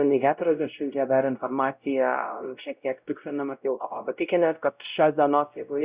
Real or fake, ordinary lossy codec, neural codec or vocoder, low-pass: fake; Opus, 64 kbps; codec, 16 kHz, 0.5 kbps, X-Codec, WavLM features, trained on Multilingual LibriSpeech; 3.6 kHz